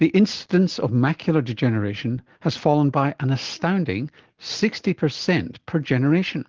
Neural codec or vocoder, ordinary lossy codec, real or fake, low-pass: none; Opus, 16 kbps; real; 7.2 kHz